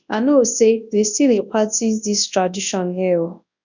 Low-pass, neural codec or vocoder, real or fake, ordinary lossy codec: 7.2 kHz; codec, 24 kHz, 0.9 kbps, WavTokenizer, large speech release; fake; none